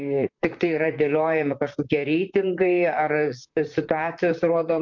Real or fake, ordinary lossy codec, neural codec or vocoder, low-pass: fake; MP3, 48 kbps; vocoder, 44.1 kHz, 128 mel bands, Pupu-Vocoder; 7.2 kHz